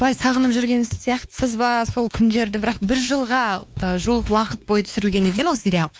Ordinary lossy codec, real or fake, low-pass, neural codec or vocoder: none; fake; none; codec, 16 kHz, 2 kbps, X-Codec, WavLM features, trained on Multilingual LibriSpeech